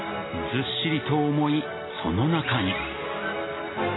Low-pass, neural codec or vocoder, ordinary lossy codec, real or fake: 7.2 kHz; none; AAC, 16 kbps; real